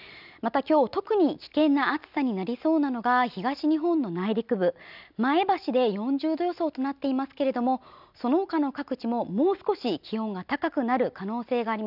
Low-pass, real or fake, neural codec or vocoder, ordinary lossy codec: 5.4 kHz; real; none; none